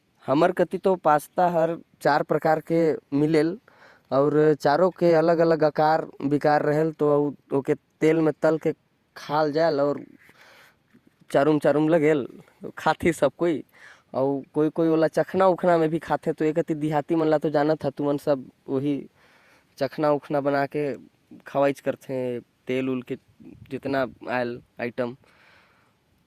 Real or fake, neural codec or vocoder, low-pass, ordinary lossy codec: fake; vocoder, 48 kHz, 128 mel bands, Vocos; 14.4 kHz; Opus, 64 kbps